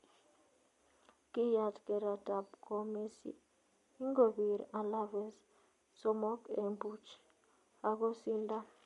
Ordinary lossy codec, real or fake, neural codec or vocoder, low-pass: MP3, 48 kbps; real; none; 14.4 kHz